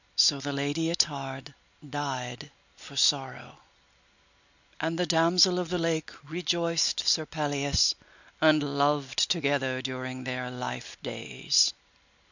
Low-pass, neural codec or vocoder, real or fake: 7.2 kHz; none; real